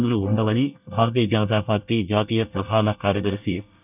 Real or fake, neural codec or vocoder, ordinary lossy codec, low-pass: fake; codec, 24 kHz, 1 kbps, SNAC; none; 3.6 kHz